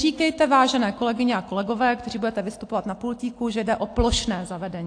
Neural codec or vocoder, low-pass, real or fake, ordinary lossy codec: none; 9.9 kHz; real; AAC, 48 kbps